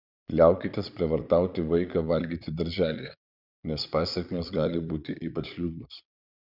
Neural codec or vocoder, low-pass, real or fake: vocoder, 22.05 kHz, 80 mel bands, Vocos; 5.4 kHz; fake